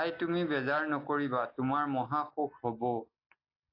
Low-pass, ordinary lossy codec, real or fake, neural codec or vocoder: 5.4 kHz; AAC, 32 kbps; real; none